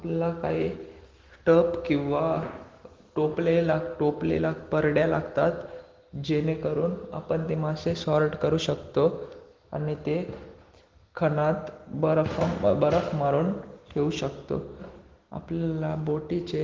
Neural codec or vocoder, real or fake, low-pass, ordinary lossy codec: none; real; 7.2 kHz; Opus, 16 kbps